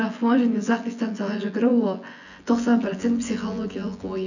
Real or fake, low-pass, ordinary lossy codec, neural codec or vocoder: fake; 7.2 kHz; none; vocoder, 24 kHz, 100 mel bands, Vocos